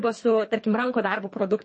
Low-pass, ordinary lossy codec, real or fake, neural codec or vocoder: 9.9 kHz; MP3, 32 kbps; fake; codec, 24 kHz, 3 kbps, HILCodec